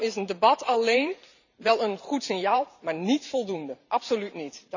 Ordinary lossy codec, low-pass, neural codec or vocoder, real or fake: none; 7.2 kHz; vocoder, 44.1 kHz, 128 mel bands every 512 samples, BigVGAN v2; fake